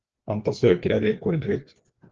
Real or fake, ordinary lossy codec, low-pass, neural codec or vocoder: fake; Opus, 16 kbps; 7.2 kHz; codec, 16 kHz, 2 kbps, FreqCodec, larger model